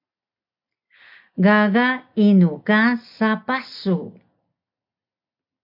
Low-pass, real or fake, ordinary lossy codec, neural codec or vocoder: 5.4 kHz; real; MP3, 48 kbps; none